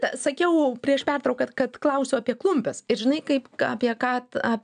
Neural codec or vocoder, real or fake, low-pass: none; real; 9.9 kHz